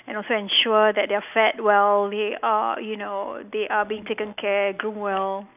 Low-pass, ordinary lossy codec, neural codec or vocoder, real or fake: 3.6 kHz; none; none; real